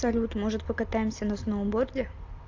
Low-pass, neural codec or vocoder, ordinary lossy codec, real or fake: 7.2 kHz; none; AAC, 48 kbps; real